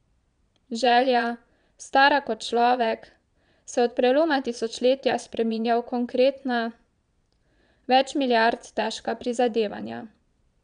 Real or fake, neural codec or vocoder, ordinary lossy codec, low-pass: fake; vocoder, 22.05 kHz, 80 mel bands, Vocos; none; 9.9 kHz